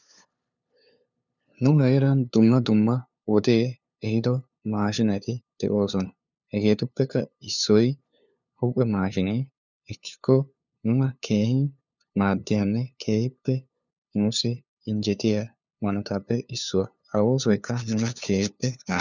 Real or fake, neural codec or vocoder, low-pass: fake; codec, 16 kHz, 2 kbps, FunCodec, trained on LibriTTS, 25 frames a second; 7.2 kHz